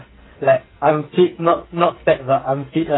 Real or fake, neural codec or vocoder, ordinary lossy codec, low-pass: fake; codec, 44.1 kHz, 2.6 kbps, SNAC; AAC, 16 kbps; 7.2 kHz